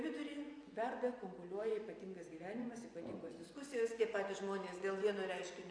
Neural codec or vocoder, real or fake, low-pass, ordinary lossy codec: vocoder, 24 kHz, 100 mel bands, Vocos; fake; 9.9 kHz; AAC, 48 kbps